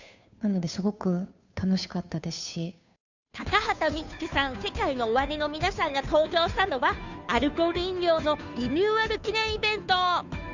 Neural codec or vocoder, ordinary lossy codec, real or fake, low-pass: codec, 16 kHz, 2 kbps, FunCodec, trained on Chinese and English, 25 frames a second; none; fake; 7.2 kHz